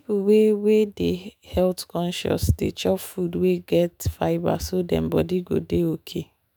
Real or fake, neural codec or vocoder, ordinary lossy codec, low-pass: fake; autoencoder, 48 kHz, 128 numbers a frame, DAC-VAE, trained on Japanese speech; none; none